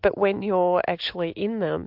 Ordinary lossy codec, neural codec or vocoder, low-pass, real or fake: MP3, 48 kbps; none; 5.4 kHz; real